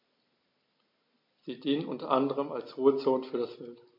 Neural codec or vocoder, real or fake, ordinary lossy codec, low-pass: none; real; MP3, 32 kbps; 5.4 kHz